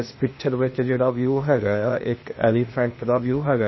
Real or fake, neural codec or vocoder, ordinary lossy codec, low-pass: fake; codec, 16 kHz, 0.8 kbps, ZipCodec; MP3, 24 kbps; 7.2 kHz